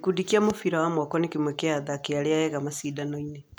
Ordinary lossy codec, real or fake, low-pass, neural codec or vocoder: none; real; none; none